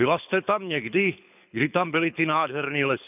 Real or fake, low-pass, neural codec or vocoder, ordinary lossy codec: fake; 3.6 kHz; codec, 24 kHz, 6 kbps, HILCodec; none